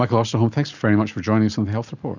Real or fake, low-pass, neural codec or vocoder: real; 7.2 kHz; none